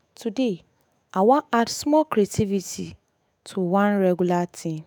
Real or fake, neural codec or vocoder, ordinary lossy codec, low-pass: fake; autoencoder, 48 kHz, 128 numbers a frame, DAC-VAE, trained on Japanese speech; none; none